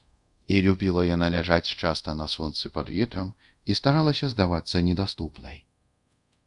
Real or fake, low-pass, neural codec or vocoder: fake; 10.8 kHz; codec, 24 kHz, 0.5 kbps, DualCodec